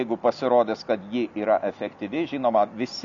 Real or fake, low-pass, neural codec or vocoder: real; 7.2 kHz; none